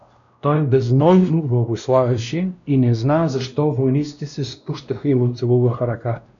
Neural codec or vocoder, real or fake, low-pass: codec, 16 kHz, 1 kbps, X-Codec, WavLM features, trained on Multilingual LibriSpeech; fake; 7.2 kHz